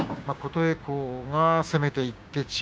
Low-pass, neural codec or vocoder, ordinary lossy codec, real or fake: none; codec, 16 kHz, 6 kbps, DAC; none; fake